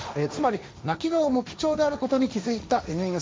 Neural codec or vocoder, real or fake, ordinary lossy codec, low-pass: codec, 16 kHz, 1.1 kbps, Voila-Tokenizer; fake; none; none